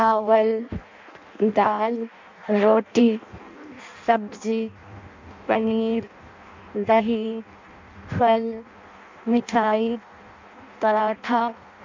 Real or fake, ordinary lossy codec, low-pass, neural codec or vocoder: fake; MP3, 64 kbps; 7.2 kHz; codec, 16 kHz in and 24 kHz out, 0.6 kbps, FireRedTTS-2 codec